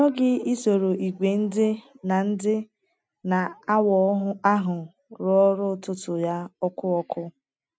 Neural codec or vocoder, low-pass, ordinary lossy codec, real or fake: none; none; none; real